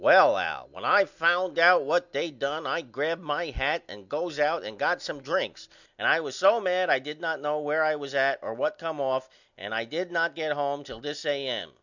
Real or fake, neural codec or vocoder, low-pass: real; none; 7.2 kHz